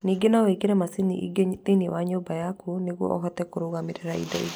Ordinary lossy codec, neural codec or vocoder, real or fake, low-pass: none; none; real; none